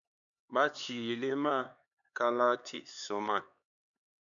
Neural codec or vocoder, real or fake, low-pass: codec, 16 kHz, 4 kbps, X-Codec, HuBERT features, trained on LibriSpeech; fake; 7.2 kHz